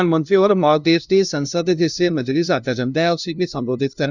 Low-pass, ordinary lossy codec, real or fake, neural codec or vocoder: 7.2 kHz; none; fake; codec, 16 kHz, 0.5 kbps, FunCodec, trained on LibriTTS, 25 frames a second